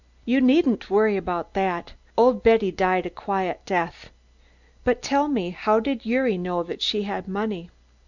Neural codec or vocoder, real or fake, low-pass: none; real; 7.2 kHz